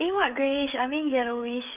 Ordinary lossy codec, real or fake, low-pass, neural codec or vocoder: Opus, 24 kbps; fake; 3.6 kHz; codec, 16 kHz, 16 kbps, FreqCodec, smaller model